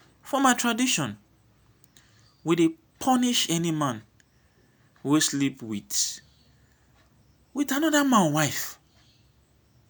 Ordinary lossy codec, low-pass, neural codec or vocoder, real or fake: none; none; none; real